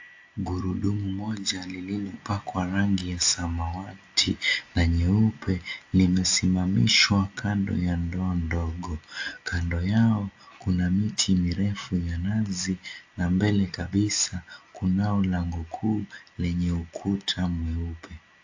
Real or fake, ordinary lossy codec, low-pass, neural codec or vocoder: real; AAC, 48 kbps; 7.2 kHz; none